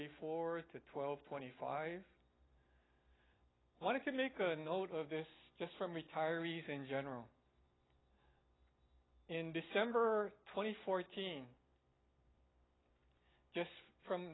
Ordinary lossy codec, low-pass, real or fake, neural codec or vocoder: AAC, 16 kbps; 7.2 kHz; real; none